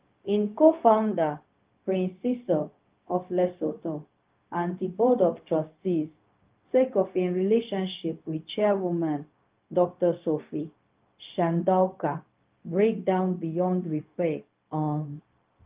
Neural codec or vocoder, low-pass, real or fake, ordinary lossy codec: codec, 16 kHz, 0.4 kbps, LongCat-Audio-Codec; 3.6 kHz; fake; Opus, 32 kbps